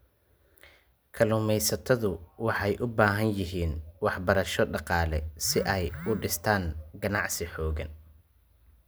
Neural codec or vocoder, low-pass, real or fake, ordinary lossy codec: none; none; real; none